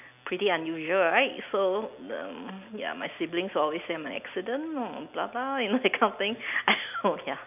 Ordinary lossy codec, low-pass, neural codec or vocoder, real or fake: none; 3.6 kHz; none; real